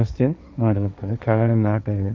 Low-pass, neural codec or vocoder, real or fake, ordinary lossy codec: none; codec, 16 kHz, 1.1 kbps, Voila-Tokenizer; fake; none